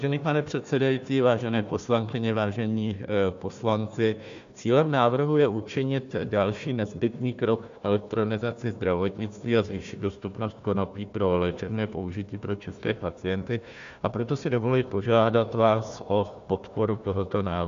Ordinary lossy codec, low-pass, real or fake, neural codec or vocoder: MP3, 64 kbps; 7.2 kHz; fake; codec, 16 kHz, 1 kbps, FunCodec, trained on Chinese and English, 50 frames a second